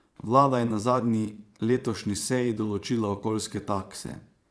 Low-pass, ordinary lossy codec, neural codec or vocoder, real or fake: none; none; vocoder, 22.05 kHz, 80 mel bands, Vocos; fake